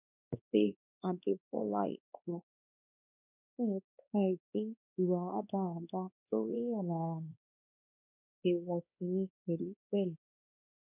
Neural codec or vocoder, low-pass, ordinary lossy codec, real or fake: codec, 16 kHz, 2 kbps, X-Codec, WavLM features, trained on Multilingual LibriSpeech; 3.6 kHz; none; fake